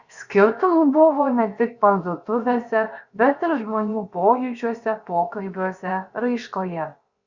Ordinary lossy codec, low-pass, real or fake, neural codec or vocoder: Opus, 64 kbps; 7.2 kHz; fake; codec, 16 kHz, 0.7 kbps, FocalCodec